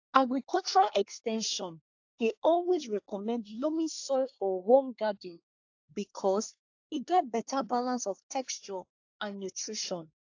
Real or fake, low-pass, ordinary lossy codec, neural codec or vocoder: fake; 7.2 kHz; AAC, 48 kbps; codec, 24 kHz, 1 kbps, SNAC